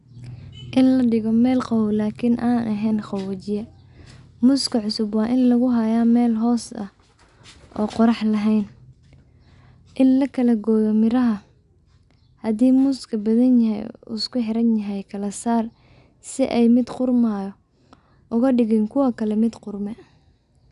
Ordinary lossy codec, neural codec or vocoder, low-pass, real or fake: none; none; 10.8 kHz; real